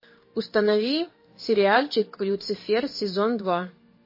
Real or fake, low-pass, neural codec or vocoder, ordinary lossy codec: fake; 5.4 kHz; codec, 16 kHz in and 24 kHz out, 1 kbps, XY-Tokenizer; MP3, 24 kbps